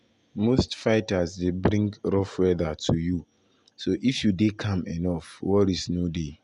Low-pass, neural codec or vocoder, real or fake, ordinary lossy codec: 10.8 kHz; none; real; none